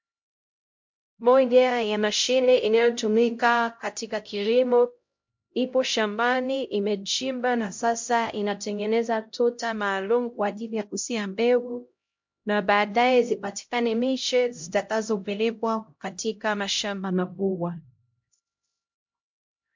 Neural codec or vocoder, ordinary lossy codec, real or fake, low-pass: codec, 16 kHz, 0.5 kbps, X-Codec, HuBERT features, trained on LibriSpeech; MP3, 48 kbps; fake; 7.2 kHz